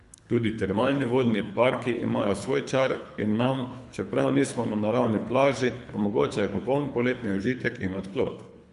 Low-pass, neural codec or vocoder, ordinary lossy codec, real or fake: 10.8 kHz; codec, 24 kHz, 3 kbps, HILCodec; AAC, 96 kbps; fake